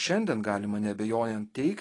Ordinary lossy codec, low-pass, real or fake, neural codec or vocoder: AAC, 32 kbps; 10.8 kHz; real; none